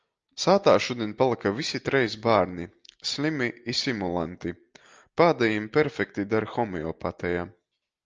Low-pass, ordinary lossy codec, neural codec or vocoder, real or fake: 7.2 kHz; Opus, 24 kbps; none; real